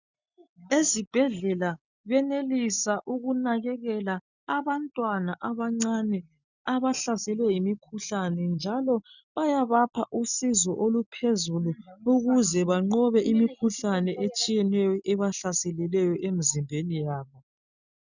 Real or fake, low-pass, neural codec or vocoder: real; 7.2 kHz; none